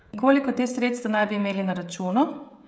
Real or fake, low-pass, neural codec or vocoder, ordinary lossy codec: fake; none; codec, 16 kHz, 16 kbps, FreqCodec, smaller model; none